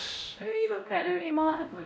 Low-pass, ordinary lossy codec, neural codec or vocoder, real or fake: none; none; codec, 16 kHz, 0.5 kbps, X-Codec, WavLM features, trained on Multilingual LibriSpeech; fake